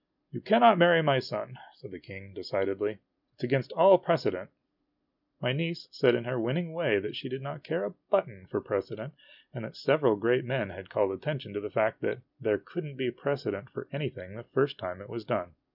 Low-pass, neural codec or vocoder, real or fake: 5.4 kHz; none; real